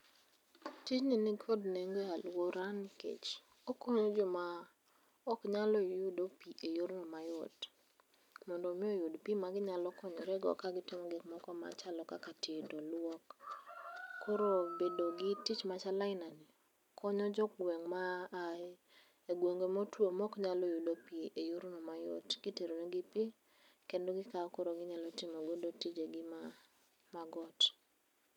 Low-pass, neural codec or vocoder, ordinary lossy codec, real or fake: 19.8 kHz; none; none; real